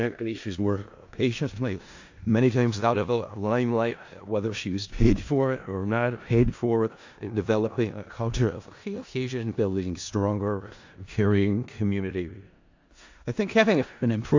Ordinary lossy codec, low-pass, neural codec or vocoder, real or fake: AAC, 48 kbps; 7.2 kHz; codec, 16 kHz in and 24 kHz out, 0.4 kbps, LongCat-Audio-Codec, four codebook decoder; fake